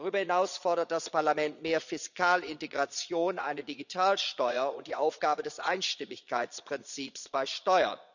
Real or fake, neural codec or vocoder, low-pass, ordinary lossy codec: fake; vocoder, 22.05 kHz, 80 mel bands, Vocos; 7.2 kHz; none